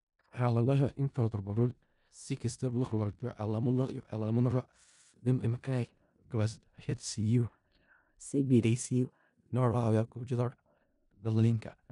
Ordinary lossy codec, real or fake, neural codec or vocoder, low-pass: none; fake; codec, 16 kHz in and 24 kHz out, 0.4 kbps, LongCat-Audio-Codec, four codebook decoder; 10.8 kHz